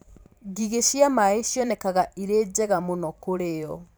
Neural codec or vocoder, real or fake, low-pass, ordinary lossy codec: vocoder, 44.1 kHz, 128 mel bands every 512 samples, BigVGAN v2; fake; none; none